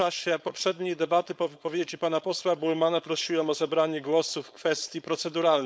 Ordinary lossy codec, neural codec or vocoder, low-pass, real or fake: none; codec, 16 kHz, 4.8 kbps, FACodec; none; fake